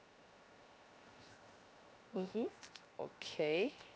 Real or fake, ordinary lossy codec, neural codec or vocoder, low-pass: fake; none; codec, 16 kHz, 0.7 kbps, FocalCodec; none